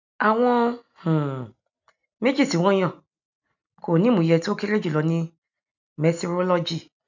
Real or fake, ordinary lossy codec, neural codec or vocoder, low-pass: real; none; none; 7.2 kHz